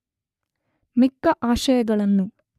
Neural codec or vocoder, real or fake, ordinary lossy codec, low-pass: codec, 44.1 kHz, 3.4 kbps, Pupu-Codec; fake; none; 14.4 kHz